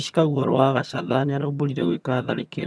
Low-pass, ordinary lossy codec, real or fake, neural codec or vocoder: none; none; fake; vocoder, 22.05 kHz, 80 mel bands, HiFi-GAN